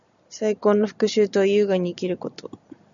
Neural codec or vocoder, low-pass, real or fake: none; 7.2 kHz; real